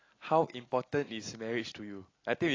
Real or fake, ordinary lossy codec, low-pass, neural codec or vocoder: real; AAC, 32 kbps; 7.2 kHz; none